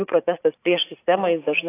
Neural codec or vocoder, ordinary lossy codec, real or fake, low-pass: vocoder, 44.1 kHz, 80 mel bands, Vocos; AAC, 24 kbps; fake; 3.6 kHz